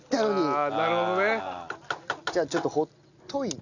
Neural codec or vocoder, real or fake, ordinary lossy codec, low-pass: none; real; none; 7.2 kHz